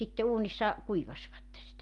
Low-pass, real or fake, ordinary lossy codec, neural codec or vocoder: 10.8 kHz; real; Opus, 32 kbps; none